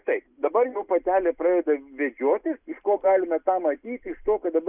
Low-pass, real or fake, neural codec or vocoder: 3.6 kHz; real; none